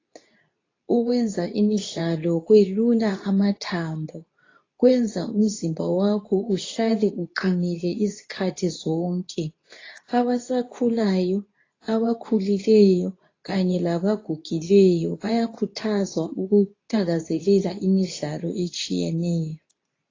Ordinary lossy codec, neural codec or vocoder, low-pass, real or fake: AAC, 32 kbps; codec, 24 kHz, 0.9 kbps, WavTokenizer, medium speech release version 2; 7.2 kHz; fake